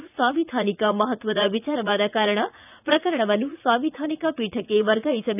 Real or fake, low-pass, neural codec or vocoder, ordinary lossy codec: fake; 3.6 kHz; vocoder, 44.1 kHz, 80 mel bands, Vocos; none